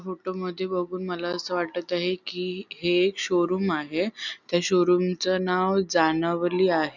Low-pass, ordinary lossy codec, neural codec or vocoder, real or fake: 7.2 kHz; none; none; real